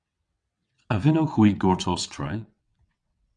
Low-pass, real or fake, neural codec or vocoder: 9.9 kHz; fake; vocoder, 22.05 kHz, 80 mel bands, WaveNeXt